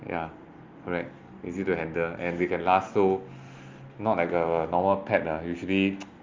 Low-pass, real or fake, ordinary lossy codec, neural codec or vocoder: 7.2 kHz; real; Opus, 32 kbps; none